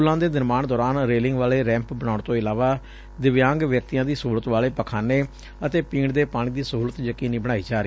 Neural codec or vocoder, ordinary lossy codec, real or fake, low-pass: none; none; real; none